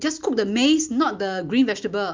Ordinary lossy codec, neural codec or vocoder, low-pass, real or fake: Opus, 24 kbps; none; 7.2 kHz; real